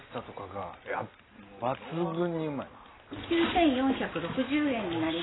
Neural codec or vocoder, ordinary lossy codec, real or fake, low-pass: none; AAC, 16 kbps; real; 7.2 kHz